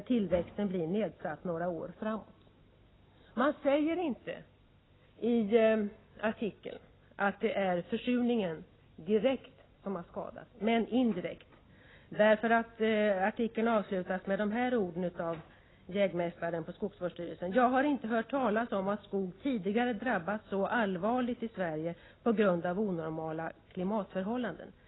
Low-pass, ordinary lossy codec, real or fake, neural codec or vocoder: 7.2 kHz; AAC, 16 kbps; real; none